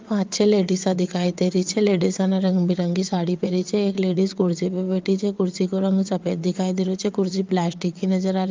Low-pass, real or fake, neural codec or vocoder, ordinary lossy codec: 7.2 kHz; fake; vocoder, 22.05 kHz, 80 mel bands, WaveNeXt; Opus, 24 kbps